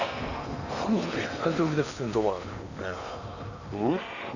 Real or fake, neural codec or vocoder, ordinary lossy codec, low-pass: fake; codec, 16 kHz in and 24 kHz out, 0.8 kbps, FocalCodec, streaming, 65536 codes; none; 7.2 kHz